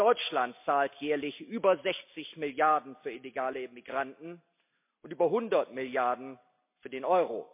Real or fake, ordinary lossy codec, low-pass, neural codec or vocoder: real; MP3, 32 kbps; 3.6 kHz; none